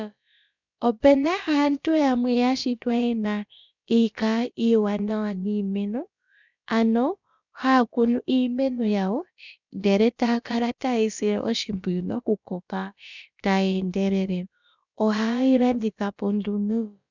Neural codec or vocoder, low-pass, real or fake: codec, 16 kHz, about 1 kbps, DyCAST, with the encoder's durations; 7.2 kHz; fake